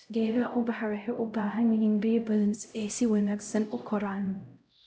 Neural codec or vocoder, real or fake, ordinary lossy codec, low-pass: codec, 16 kHz, 0.5 kbps, X-Codec, HuBERT features, trained on LibriSpeech; fake; none; none